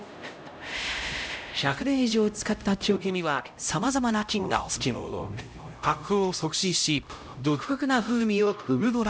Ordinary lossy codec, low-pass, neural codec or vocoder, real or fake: none; none; codec, 16 kHz, 0.5 kbps, X-Codec, HuBERT features, trained on LibriSpeech; fake